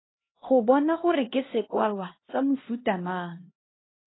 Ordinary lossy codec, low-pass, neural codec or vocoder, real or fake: AAC, 16 kbps; 7.2 kHz; codec, 16 kHz, 1 kbps, X-Codec, HuBERT features, trained on LibriSpeech; fake